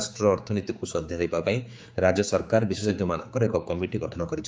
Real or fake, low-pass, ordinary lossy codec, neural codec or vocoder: fake; none; none; codec, 16 kHz, 4 kbps, X-Codec, HuBERT features, trained on general audio